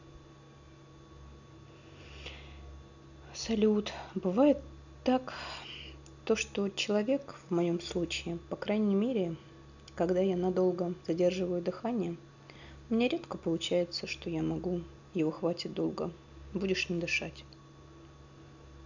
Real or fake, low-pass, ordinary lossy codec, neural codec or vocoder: real; 7.2 kHz; none; none